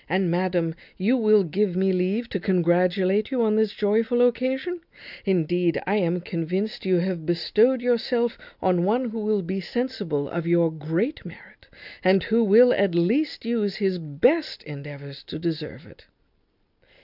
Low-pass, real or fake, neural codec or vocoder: 5.4 kHz; real; none